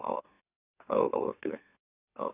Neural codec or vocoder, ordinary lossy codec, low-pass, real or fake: autoencoder, 44.1 kHz, a latent of 192 numbers a frame, MeloTTS; none; 3.6 kHz; fake